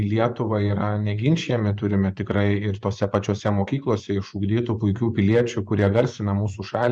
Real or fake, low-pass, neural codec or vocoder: fake; 9.9 kHz; vocoder, 24 kHz, 100 mel bands, Vocos